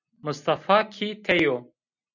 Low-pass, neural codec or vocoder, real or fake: 7.2 kHz; none; real